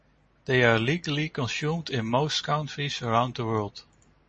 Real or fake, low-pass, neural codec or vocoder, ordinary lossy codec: real; 10.8 kHz; none; MP3, 32 kbps